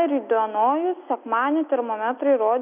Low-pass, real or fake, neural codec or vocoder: 3.6 kHz; real; none